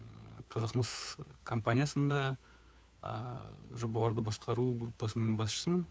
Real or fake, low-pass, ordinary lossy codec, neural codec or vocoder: fake; none; none; codec, 16 kHz, 4 kbps, FunCodec, trained on LibriTTS, 50 frames a second